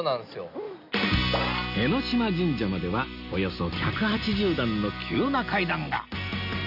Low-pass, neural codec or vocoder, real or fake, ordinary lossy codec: 5.4 kHz; none; real; none